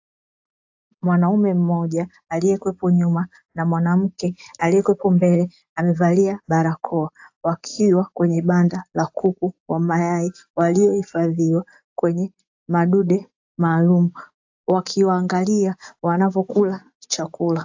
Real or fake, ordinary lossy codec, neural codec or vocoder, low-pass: real; AAC, 48 kbps; none; 7.2 kHz